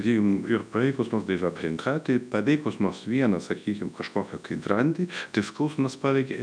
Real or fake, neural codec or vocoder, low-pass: fake; codec, 24 kHz, 0.9 kbps, WavTokenizer, large speech release; 9.9 kHz